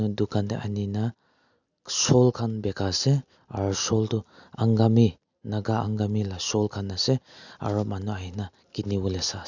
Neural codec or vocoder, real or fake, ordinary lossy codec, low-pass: none; real; none; 7.2 kHz